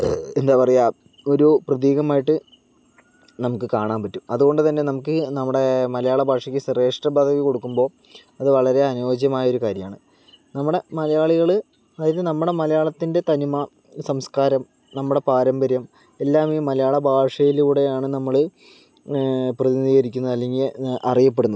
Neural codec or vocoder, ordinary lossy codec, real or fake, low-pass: none; none; real; none